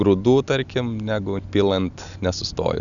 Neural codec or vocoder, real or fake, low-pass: none; real; 7.2 kHz